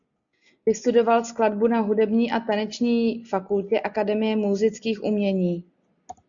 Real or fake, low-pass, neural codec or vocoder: real; 7.2 kHz; none